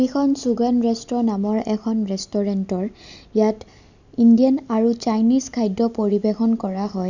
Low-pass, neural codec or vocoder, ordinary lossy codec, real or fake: 7.2 kHz; none; none; real